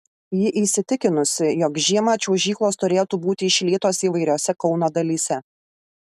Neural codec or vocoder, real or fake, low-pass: none; real; 14.4 kHz